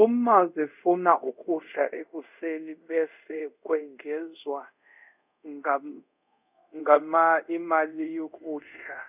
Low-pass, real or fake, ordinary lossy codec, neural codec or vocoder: 3.6 kHz; fake; none; codec, 24 kHz, 0.5 kbps, DualCodec